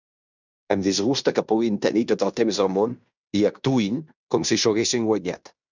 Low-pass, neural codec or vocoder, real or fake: 7.2 kHz; codec, 16 kHz in and 24 kHz out, 0.9 kbps, LongCat-Audio-Codec, fine tuned four codebook decoder; fake